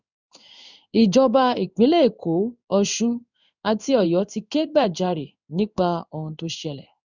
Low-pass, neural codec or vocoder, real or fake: 7.2 kHz; codec, 16 kHz in and 24 kHz out, 1 kbps, XY-Tokenizer; fake